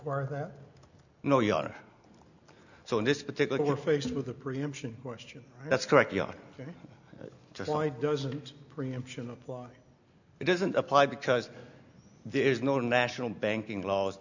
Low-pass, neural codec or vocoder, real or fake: 7.2 kHz; none; real